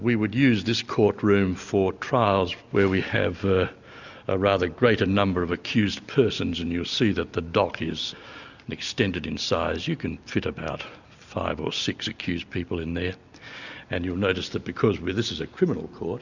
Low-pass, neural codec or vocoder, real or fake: 7.2 kHz; none; real